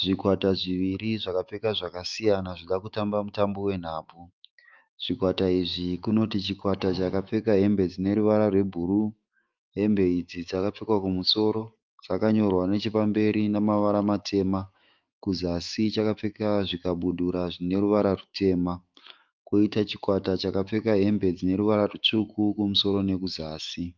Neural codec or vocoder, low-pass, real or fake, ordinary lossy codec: autoencoder, 48 kHz, 128 numbers a frame, DAC-VAE, trained on Japanese speech; 7.2 kHz; fake; Opus, 24 kbps